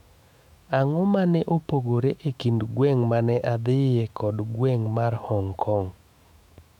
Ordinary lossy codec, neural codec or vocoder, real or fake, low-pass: none; autoencoder, 48 kHz, 128 numbers a frame, DAC-VAE, trained on Japanese speech; fake; 19.8 kHz